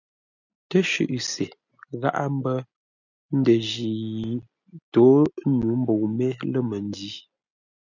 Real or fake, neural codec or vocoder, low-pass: real; none; 7.2 kHz